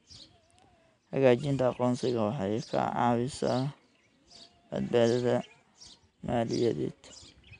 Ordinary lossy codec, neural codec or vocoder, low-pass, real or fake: none; none; 9.9 kHz; real